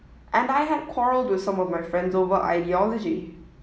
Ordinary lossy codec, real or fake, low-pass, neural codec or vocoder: none; real; none; none